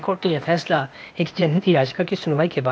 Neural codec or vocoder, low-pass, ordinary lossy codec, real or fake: codec, 16 kHz, 0.8 kbps, ZipCodec; none; none; fake